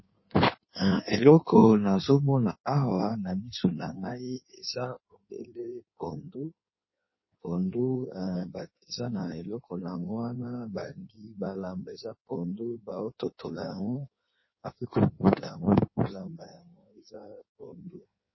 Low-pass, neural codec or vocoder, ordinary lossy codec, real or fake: 7.2 kHz; codec, 16 kHz in and 24 kHz out, 1.1 kbps, FireRedTTS-2 codec; MP3, 24 kbps; fake